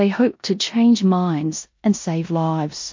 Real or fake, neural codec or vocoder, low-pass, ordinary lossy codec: fake; codec, 16 kHz in and 24 kHz out, 0.9 kbps, LongCat-Audio-Codec, four codebook decoder; 7.2 kHz; MP3, 48 kbps